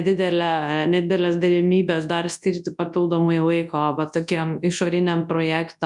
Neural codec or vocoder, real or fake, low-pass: codec, 24 kHz, 0.9 kbps, WavTokenizer, large speech release; fake; 10.8 kHz